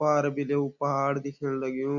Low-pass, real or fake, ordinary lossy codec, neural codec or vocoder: 7.2 kHz; real; none; none